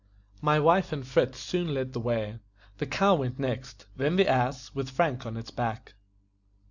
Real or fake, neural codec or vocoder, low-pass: real; none; 7.2 kHz